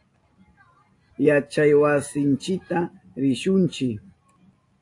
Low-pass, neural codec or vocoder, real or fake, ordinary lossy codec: 10.8 kHz; none; real; AAC, 48 kbps